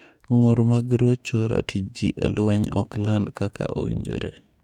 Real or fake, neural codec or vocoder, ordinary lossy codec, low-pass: fake; codec, 44.1 kHz, 2.6 kbps, DAC; none; 19.8 kHz